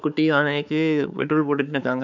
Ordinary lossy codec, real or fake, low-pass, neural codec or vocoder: none; fake; 7.2 kHz; codec, 16 kHz, 4 kbps, X-Codec, HuBERT features, trained on balanced general audio